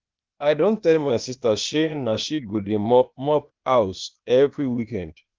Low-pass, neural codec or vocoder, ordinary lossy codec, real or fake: 7.2 kHz; codec, 16 kHz, 0.8 kbps, ZipCodec; Opus, 24 kbps; fake